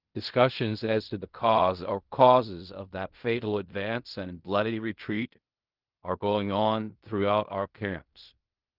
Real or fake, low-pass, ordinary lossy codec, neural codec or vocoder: fake; 5.4 kHz; Opus, 32 kbps; codec, 16 kHz in and 24 kHz out, 0.4 kbps, LongCat-Audio-Codec, fine tuned four codebook decoder